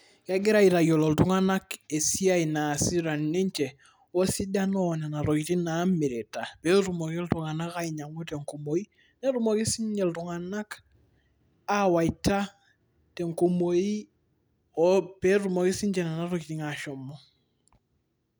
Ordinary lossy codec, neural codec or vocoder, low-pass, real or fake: none; none; none; real